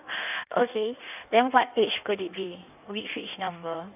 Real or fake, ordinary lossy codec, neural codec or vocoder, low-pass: fake; none; codec, 16 kHz in and 24 kHz out, 1.1 kbps, FireRedTTS-2 codec; 3.6 kHz